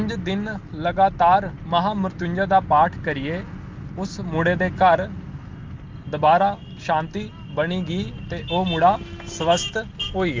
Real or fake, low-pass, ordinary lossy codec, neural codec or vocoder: real; 7.2 kHz; Opus, 24 kbps; none